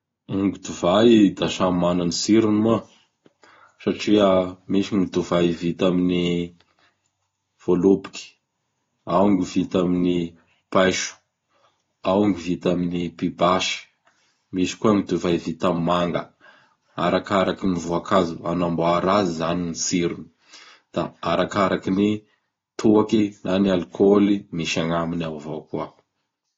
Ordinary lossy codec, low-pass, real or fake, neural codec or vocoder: AAC, 32 kbps; 7.2 kHz; real; none